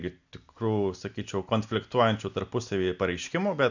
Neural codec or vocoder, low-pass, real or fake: none; 7.2 kHz; real